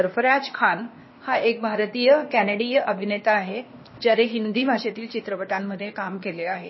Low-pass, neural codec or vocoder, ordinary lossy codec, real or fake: 7.2 kHz; codec, 16 kHz, 0.8 kbps, ZipCodec; MP3, 24 kbps; fake